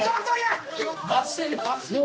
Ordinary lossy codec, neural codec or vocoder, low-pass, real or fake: none; codec, 16 kHz, 1 kbps, X-Codec, HuBERT features, trained on general audio; none; fake